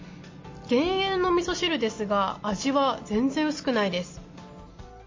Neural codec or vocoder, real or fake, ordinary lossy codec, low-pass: none; real; MP3, 32 kbps; 7.2 kHz